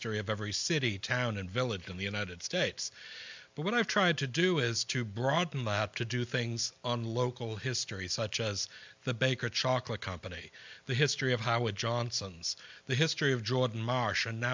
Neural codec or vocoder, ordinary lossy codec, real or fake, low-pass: none; MP3, 64 kbps; real; 7.2 kHz